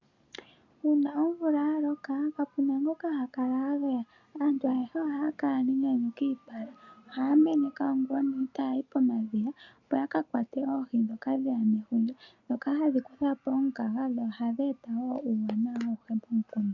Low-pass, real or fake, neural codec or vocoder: 7.2 kHz; real; none